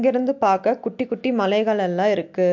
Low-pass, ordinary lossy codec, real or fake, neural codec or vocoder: 7.2 kHz; MP3, 48 kbps; real; none